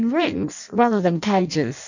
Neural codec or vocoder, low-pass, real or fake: codec, 16 kHz in and 24 kHz out, 0.6 kbps, FireRedTTS-2 codec; 7.2 kHz; fake